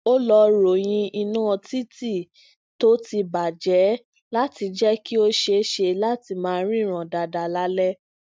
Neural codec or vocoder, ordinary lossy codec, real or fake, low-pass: none; none; real; none